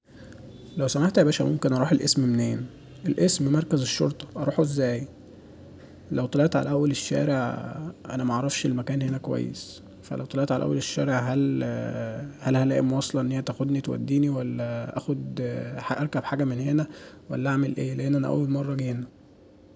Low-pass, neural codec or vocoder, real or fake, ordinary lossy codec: none; none; real; none